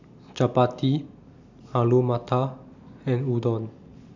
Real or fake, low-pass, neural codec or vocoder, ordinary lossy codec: real; 7.2 kHz; none; none